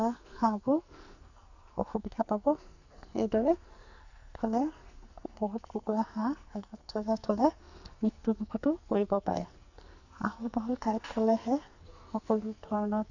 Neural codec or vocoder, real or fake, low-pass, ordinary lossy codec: codec, 44.1 kHz, 2.6 kbps, SNAC; fake; 7.2 kHz; none